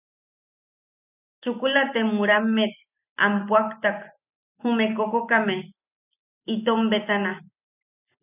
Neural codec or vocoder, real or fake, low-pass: none; real; 3.6 kHz